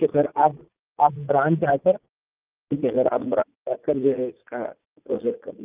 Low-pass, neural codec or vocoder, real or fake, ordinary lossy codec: 3.6 kHz; vocoder, 44.1 kHz, 80 mel bands, Vocos; fake; Opus, 24 kbps